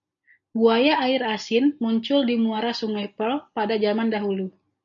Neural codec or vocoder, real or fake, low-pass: none; real; 7.2 kHz